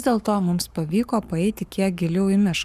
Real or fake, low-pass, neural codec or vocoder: fake; 14.4 kHz; codec, 44.1 kHz, 7.8 kbps, Pupu-Codec